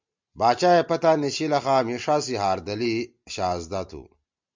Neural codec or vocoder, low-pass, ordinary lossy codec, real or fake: none; 7.2 kHz; MP3, 64 kbps; real